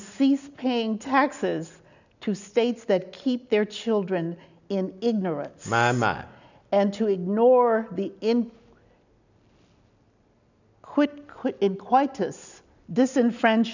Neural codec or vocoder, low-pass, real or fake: none; 7.2 kHz; real